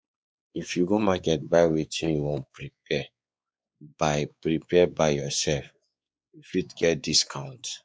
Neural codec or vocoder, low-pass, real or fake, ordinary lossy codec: codec, 16 kHz, 4 kbps, X-Codec, WavLM features, trained on Multilingual LibriSpeech; none; fake; none